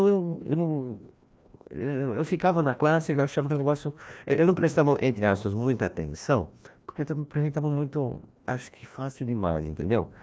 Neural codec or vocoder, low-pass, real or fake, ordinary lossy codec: codec, 16 kHz, 1 kbps, FreqCodec, larger model; none; fake; none